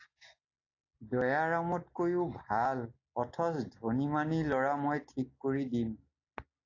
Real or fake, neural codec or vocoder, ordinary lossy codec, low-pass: real; none; AAC, 48 kbps; 7.2 kHz